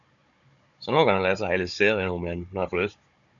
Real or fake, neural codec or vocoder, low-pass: fake; codec, 16 kHz, 16 kbps, FunCodec, trained on Chinese and English, 50 frames a second; 7.2 kHz